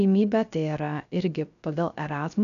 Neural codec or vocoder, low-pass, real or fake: codec, 16 kHz, 0.3 kbps, FocalCodec; 7.2 kHz; fake